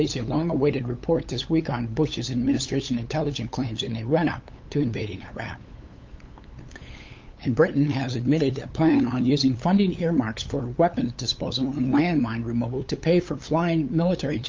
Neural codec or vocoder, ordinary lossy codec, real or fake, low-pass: codec, 16 kHz, 8 kbps, FunCodec, trained on LibriTTS, 25 frames a second; Opus, 24 kbps; fake; 7.2 kHz